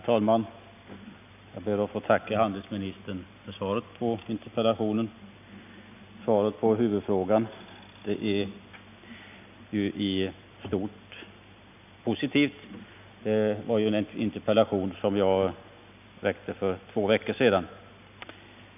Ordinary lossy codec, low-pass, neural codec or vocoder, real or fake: none; 3.6 kHz; none; real